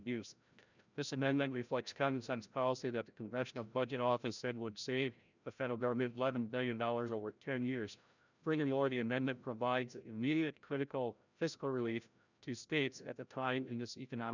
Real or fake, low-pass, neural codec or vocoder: fake; 7.2 kHz; codec, 16 kHz, 0.5 kbps, FreqCodec, larger model